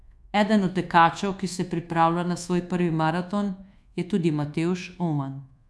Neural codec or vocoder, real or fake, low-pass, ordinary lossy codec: codec, 24 kHz, 1.2 kbps, DualCodec; fake; none; none